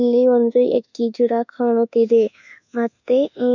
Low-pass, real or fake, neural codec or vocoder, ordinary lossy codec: 7.2 kHz; fake; codec, 24 kHz, 1.2 kbps, DualCodec; none